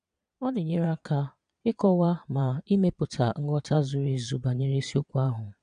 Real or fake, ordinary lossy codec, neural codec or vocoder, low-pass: fake; none; vocoder, 22.05 kHz, 80 mel bands, Vocos; 9.9 kHz